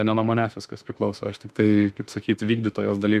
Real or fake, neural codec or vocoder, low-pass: fake; autoencoder, 48 kHz, 32 numbers a frame, DAC-VAE, trained on Japanese speech; 14.4 kHz